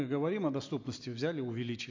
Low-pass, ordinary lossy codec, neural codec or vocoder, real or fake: 7.2 kHz; MP3, 48 kbps; none; real